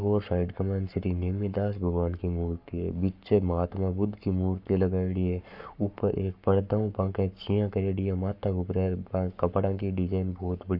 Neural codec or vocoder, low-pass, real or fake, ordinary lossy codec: codec, 44.1 kHz, 7.8 kbps, Pupu-Codec; 5.4 kHz; fake; AAC, 48 kbps